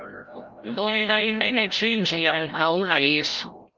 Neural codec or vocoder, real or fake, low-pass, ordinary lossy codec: codec, 16 kHz, 0.5 kbps, FreqCodec, larger model; fake; 7.2 kHz; Opus, 32 kbps